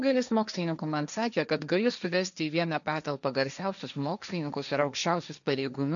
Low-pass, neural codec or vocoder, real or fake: 7.2 kHz; codec, 16 kHz, 1.1 kbps, Voila-Tokenizer; fake